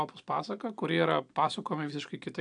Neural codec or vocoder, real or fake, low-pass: none; real; 9.9 kHz